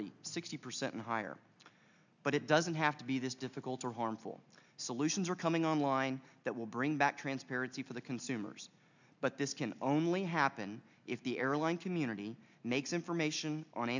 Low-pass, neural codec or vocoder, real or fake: 7.2 kHz; none; real